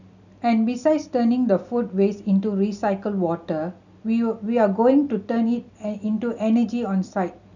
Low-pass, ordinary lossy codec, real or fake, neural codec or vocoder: 7.2 kHz; none; real; none